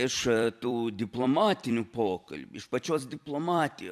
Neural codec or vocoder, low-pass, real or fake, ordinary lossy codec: vocoder, 44.1 kHz, 128 mel bands every 256 samples, BigVGAN v2; 14.4 kHz; fake; AAC, 96 kbps